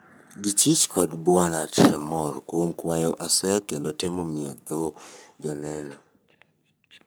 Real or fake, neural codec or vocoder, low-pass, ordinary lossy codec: fake; codec, 44.1 kHz, 3.4 kbps, Pupu-Codec; none; none